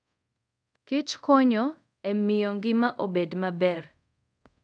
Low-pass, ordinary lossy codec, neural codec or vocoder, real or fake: 9.9 kHz; none; codec, 24 kHz, 0.5 kbps, DualCodec; fake